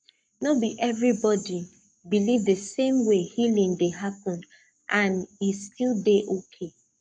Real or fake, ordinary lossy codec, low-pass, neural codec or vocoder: fake; none; 9.9 kHz; codec, 44.1 kHz, 7.8 kbps, Pupu-Codec